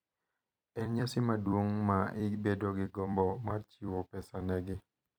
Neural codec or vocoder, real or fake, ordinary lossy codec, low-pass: vocoder, 44.1 kHz, 128 mel bands every 256 samples, BigVGAN v2; fake; none; none